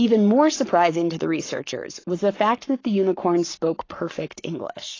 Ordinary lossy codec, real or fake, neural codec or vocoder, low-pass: AAC, 32 kbps; fake; codec, 44.1 kHz, 7.8 kbps, Pupu-Codec; 7.2 kHz